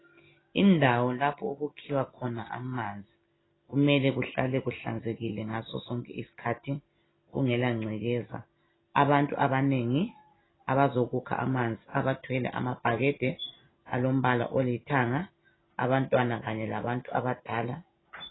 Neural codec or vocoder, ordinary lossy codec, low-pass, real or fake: none; AAC, 16 kbps; 7.2 kHz; real